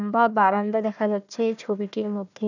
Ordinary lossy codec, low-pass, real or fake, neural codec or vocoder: none; 7.2 kHz; fake; codec, 16 kHz, 1 kbps, FunCodec, trained on Chinese and English, 50 frames a second